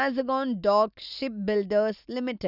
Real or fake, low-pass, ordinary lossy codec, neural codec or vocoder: real; 5.4 kHz; none; none